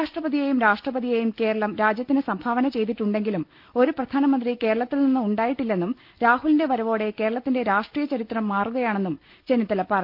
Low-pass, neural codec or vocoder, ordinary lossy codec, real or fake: 5.4 kHz; none; Opus, 32 kbps; real